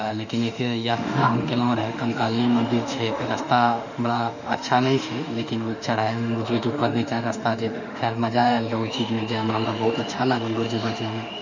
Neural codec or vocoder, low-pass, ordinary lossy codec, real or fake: autoencoder, 48 kHz, 32 numbers a frame, DAC-VAE, trained on Japanese speech; 7.2 kHz; none; fake